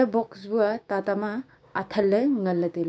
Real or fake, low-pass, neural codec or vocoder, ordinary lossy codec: fake; none; codec, 16 kHz, 6 kbps, DAC; none